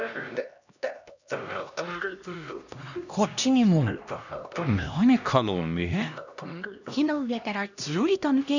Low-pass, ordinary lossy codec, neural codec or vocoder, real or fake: 7.2 kHz; none; codec, 16 kHz, 1 kbps, X-Codec, HuBERT features, trained on LibriSpeech; fake